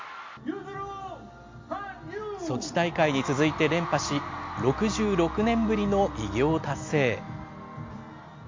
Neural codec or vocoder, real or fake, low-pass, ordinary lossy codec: none; real; 7.2 kHz; MP3, 48 kbps